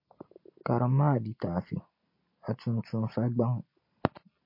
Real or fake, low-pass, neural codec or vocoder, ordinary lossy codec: real; 5.4 kHz; none; MP3, 32 kbps